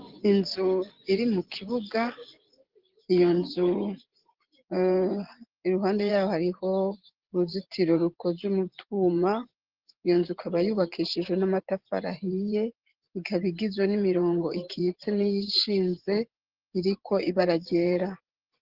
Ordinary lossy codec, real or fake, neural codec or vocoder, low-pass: Opus, 16 kbps; fake; vocoder, 24 kHz, 100 mel bands, Vocos; 5.4 kHz